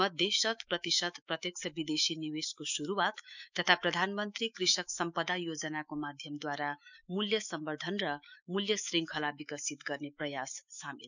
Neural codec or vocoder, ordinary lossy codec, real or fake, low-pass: autoencoder, 48 kHz, 128 numbers a frame, DAC-VAE, trained on Japanese speech; none; fake; 7.2 kHz